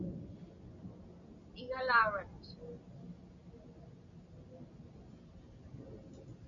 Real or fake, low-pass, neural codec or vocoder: real; 7.2 kHz; none